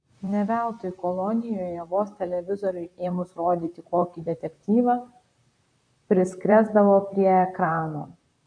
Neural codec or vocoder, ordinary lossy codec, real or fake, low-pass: vocoder, 44.1 kHz, 128 mel bands, Pupu-Vocoder; AAC, 64 kbps; fake; 9.9 kHz